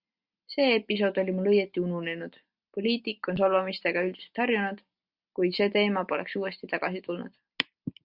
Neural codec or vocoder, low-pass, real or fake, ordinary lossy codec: none; 5.4 kHz; real; Opus, 64 kbps